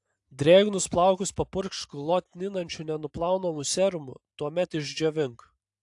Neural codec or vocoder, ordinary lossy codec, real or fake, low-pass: vocoder, 44.1 kHz, 128 mel bands every 512 samples, BigVGAN v2; AAC, 64 kbps; fake; 10.8 kHz